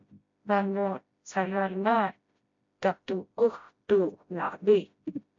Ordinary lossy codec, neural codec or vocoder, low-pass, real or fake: MP3, 48 kbps; codec, 16 kHz, 0.5 kbps, FreqCodec, smaller model; 7.2 kHz; fake